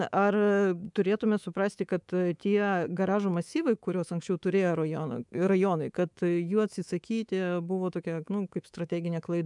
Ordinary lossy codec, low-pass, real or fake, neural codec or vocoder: MP3, 96 kbps; 10.8 kHz; fake; codec, 24 kHz, 3.1 kbps, DualCodec